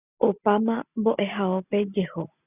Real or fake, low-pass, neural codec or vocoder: real; 3.6 kHz; none